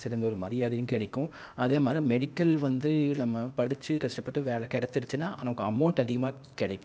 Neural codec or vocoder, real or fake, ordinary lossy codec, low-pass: codec, 16 kHz, 0.8 kbps, ZipCodec; fake; none; none